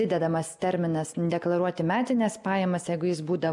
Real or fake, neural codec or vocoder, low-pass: real; none; 10.8 kHz